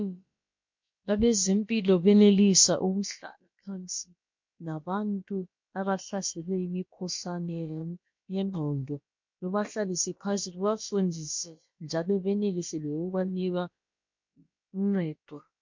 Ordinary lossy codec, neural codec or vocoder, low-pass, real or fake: MP3, 48 kbps; codec, 16 kHz, about 1 kbps, DyCAST, with the encoder's durations; 7.2 kHz; fake